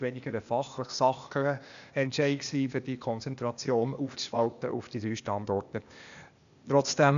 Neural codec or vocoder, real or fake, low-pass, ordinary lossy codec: codec, 16 kHz, 0.8 kbps, ZipCodec; fake; 7.2 kHz; AAC, 96 kbps